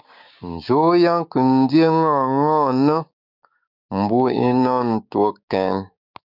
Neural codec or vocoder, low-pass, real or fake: codec, 16 kHz, 6 kbps, DAC; 5.4 kHz; fake